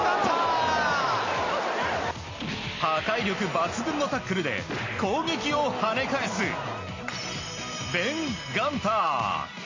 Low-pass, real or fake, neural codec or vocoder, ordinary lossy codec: 7.2 kHz; real; none; MP3, 32 kbps